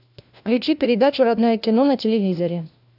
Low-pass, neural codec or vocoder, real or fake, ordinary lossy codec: 5.4 kHz; codec, 16 kHz, 1 kbps, FunCodec, trained on LibriTTS, 50 frames a second; fake; AAC, 48 kbps